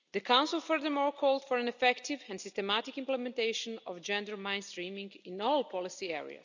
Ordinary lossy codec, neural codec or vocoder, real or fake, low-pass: none; none; real; 7.2 kHz